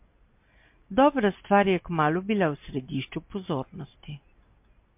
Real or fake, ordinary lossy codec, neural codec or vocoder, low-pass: real; MP3, 32 kbps; none; 3.6 kHz